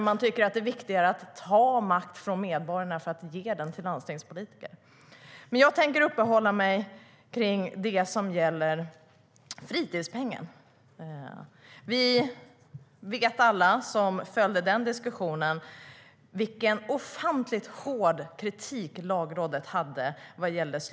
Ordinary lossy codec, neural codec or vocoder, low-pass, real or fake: none; none; none; real